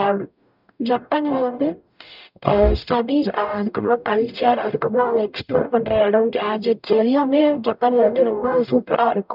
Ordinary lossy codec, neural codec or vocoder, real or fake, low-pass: none; codec, 44.1 kHz, 0.9 kbps, DAC; fake; 5.4 kHz